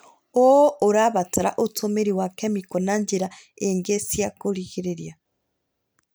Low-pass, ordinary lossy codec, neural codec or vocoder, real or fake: none; none; none; real